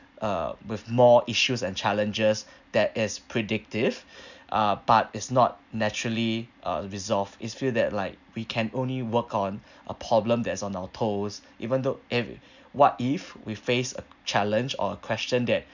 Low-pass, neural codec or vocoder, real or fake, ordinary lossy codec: 7.2 kHz; none; real; none